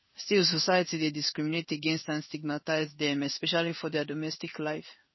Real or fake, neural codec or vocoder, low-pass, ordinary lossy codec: fake; codec, 16 kHz in and 24 kHz out, 1 kbps, XY-Tokenizer; 7.2 kHz; MP3, 24 kbps